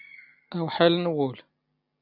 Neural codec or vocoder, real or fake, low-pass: none; real; 5.4 kHz